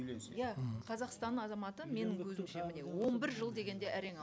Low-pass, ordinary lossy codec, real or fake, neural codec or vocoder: none; none; real; none